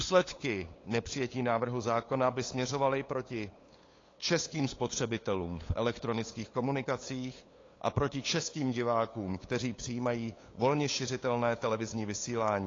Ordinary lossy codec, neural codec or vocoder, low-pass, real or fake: AAC, 32 kbps; codec, 16 kHz, 8 kbps, FunCodec, trained on LibriTTS, 25 frames a second; 7.2 kHz; fake